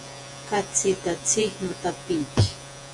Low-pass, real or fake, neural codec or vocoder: 10.8 kHz; fake; vocoder, 48 kHz, 128 mel bands, Vocos